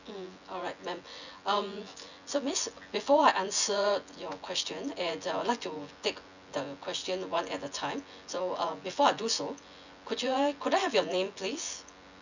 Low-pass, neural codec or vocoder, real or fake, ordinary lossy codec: 7.2 kHz; vocoder, 24 kHz, 100 mel bands, Vocos; fake; none